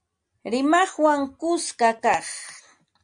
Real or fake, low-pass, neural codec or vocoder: real; 9.9 kHz; none